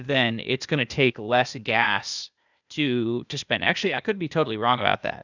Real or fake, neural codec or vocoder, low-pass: fake; codec, 16 kHz, 0.8 kbps, ZipCodec; 7.2 kHz